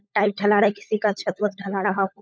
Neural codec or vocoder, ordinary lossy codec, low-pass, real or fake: codec, 16 kHz, 16 kbps, FunCodec, trained on LibriTTS, 50 frames a second; none; none; fake